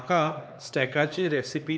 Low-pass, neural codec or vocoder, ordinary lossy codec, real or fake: none; codec, 16 kHz, 2 kbps, X-Codec, HuBERT features, trained on LibriSpeech; none; fake